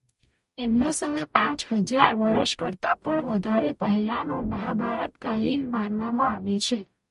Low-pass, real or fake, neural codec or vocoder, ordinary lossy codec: 14.4 kHz; fake; codec, 44.1 kHz, 0.9 kbps, DAC; MP3, 48 kbps